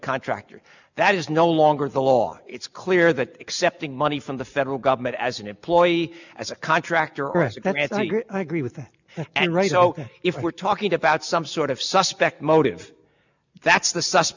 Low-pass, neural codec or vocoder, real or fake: 7.2 kHz; none; real